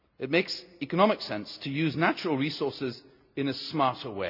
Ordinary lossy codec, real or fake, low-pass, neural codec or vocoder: none; real; 5.4 kHz; none